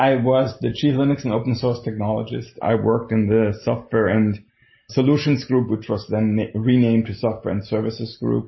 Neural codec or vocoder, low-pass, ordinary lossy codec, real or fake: none; 7.2 kHz; MP3, 24 kbps; real